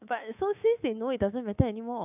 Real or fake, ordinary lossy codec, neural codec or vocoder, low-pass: fake; none; codec, 24 kHz, 3.1 kbps, DualCodec; 3.6 kHz